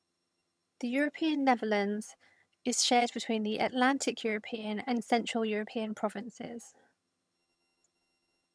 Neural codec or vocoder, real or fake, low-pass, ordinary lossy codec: vocoder, 22.05 kHz, 80 mel bands, HiFi-GAN; fake; none; none